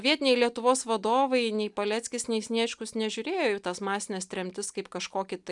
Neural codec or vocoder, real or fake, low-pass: none; real; 10.8 kHz